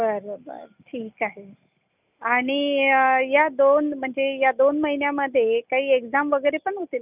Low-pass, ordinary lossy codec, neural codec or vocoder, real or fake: 3.6 kHz; none; none; real